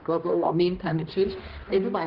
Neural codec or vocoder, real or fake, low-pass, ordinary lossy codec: codec, 16 kHz, 1 kbps, X-Codec, HuBERT features, trained on balanced general audio; fake; 5.4 kHz; Opus, 16 kbps